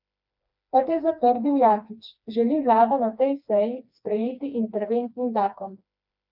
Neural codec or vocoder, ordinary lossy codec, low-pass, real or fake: codec, 16 kHz, 2 kbps, FreqCodec, smaller model; none; 5.4 kHz; fake